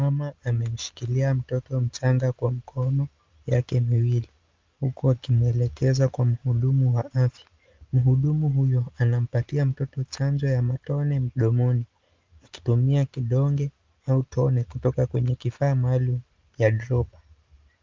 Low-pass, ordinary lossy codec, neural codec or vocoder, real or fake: 7.2 kHz; Opus, 24 kbps; none; real